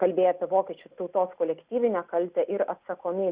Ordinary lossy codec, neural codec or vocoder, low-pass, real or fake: Opus, 64 kbps; none; 3.6 kHz; real